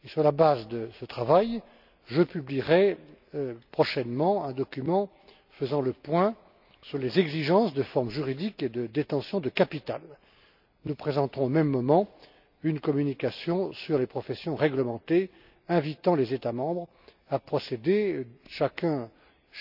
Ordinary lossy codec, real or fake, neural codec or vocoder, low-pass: none; real; none; 5.4 kHz